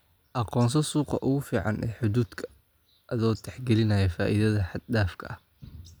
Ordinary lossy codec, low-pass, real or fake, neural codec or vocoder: none; none; real; none